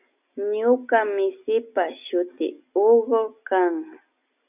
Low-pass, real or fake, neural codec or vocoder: 3.6 kHz; real; none